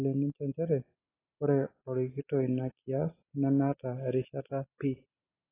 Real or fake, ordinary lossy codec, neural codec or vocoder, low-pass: real; AAC, 16 kbps; none; 3.6 kHz